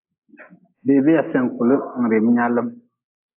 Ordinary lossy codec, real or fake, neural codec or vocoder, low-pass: AAC, 32 kbps; real; none; 3.6 kHz